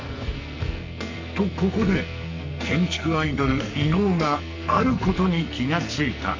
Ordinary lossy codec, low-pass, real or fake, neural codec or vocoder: MP3, 64 kbps; 7.2 kHz; fake; codec, 44.1 kHz, 2.6 kbps, SNAC